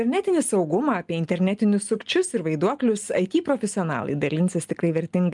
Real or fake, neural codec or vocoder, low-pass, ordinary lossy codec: real; none; 10.8 kHz; Opus, 32 kbps